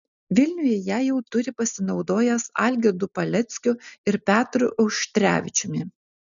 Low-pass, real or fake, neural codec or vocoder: 7.2 kHz; real; none